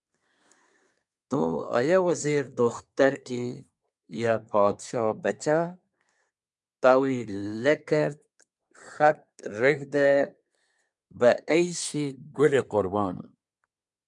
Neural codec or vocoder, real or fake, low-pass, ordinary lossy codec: codec, 24 kHz, 1 kbps, SNAC; fake; 10.8 kHz; MP3, 96 kbps